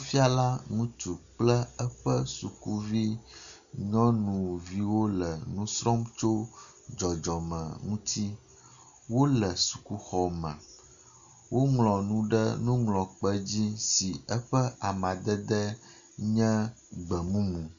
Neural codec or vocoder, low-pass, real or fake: none; 7.2 kHz; real